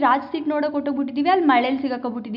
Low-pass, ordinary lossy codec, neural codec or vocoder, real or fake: 5.4 kHz; none; none; real